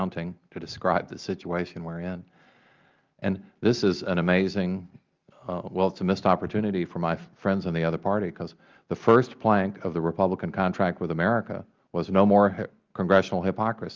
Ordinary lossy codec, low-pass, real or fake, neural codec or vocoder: Opus, 24 kbps; 7.2 kHz; real; none